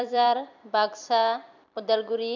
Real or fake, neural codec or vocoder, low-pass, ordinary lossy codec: fake; vocoder, 44.1 kHz, 128 mel bands every 256 samples, BigVGAN v2; 7.2 kHz; none